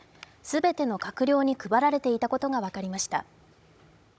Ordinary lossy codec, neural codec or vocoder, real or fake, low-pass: none; codec, 16 kHz, 16 kbps, FunCodec, trained on Chinese and English, 50 frames a second; fake; none